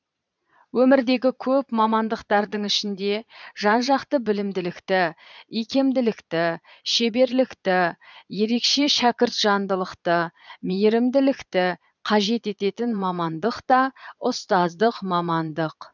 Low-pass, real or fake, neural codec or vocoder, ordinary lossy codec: 7.2 kHz; fake; vocoder, 22.05 kHz, 80 mel bands, Vocos; none